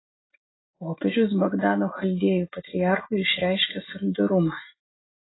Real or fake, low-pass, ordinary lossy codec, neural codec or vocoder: real; 7.2 kHz; AAC, 16 kbps; none